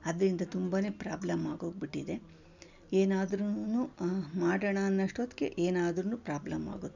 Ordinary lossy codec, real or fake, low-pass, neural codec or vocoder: none; real; 7.2 kHz; none